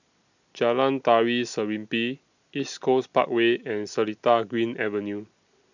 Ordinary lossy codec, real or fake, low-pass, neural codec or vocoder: none; real; 7.2 kHz; none